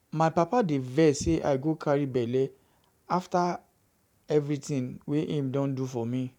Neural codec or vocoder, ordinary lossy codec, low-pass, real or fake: vocoder, 44.1 kHz, 128 mel bands every 512 samples, BigVGAN v2; none; 19.8 kHz; fake